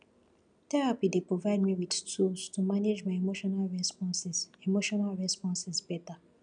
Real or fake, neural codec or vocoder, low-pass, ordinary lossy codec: real; none; 9.9 kHz; none